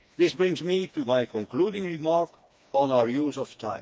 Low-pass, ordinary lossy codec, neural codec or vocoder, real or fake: none; none; codec, 16 kHz, 2 kbps, FreqCodec, smaller model; fake